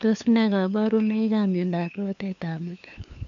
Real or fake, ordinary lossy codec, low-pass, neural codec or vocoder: fake; AAC, 64 kbps; 7.2 kHz; codec, 16 kHz, 2 kbps, FunCodec, trained on LibriTTS, 25 frames a second